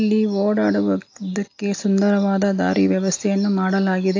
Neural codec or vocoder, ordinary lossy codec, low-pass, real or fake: none; AAC, 48 kbps; 7.2 kHz; real